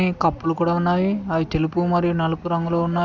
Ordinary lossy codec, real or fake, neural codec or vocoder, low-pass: Opus, 64 kbps; real; none; 7.2 kHz